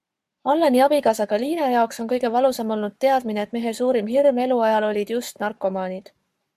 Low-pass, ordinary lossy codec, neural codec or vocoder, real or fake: 14.4 kHz; MP3, 96 kbps; codec, 44.1 kHz, 7.8 kbps, Pupu-Codec; fake